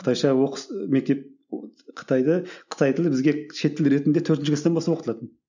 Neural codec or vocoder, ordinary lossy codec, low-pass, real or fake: none; none; 7.2 kHz; real